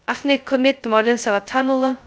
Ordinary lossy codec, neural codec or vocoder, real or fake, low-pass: none; codec, 16 kHz, 0.2 kbps, FocalCodec; fake; none